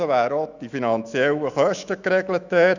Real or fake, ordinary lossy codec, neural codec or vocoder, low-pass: fake; none; autoencoder, 48 kHz, 128 numbers a frame, DAC-VAE, trained on Japanese speech; 7.2 kHz